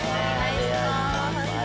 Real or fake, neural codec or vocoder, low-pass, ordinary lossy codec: real; none; none; none